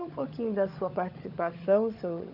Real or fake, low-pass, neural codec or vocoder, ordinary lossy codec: fake; 5.4 kHz; codec, 16 kHz, 16 kbps, FunCodec, trained on LibriTTS, 50 frames a second; none